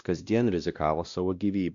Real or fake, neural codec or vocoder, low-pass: fake; codec, 16 kHz, 1 kbps, X-Codec, WavLM features, trained on Multilingual LibriSpeech; 7.2 kHz